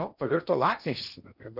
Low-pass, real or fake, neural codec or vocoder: 5.4 kHz; fake; codec, 16 kHz in and 24 kHz out, 0.8 kbps, FocalCodec, streaming, 65536 codes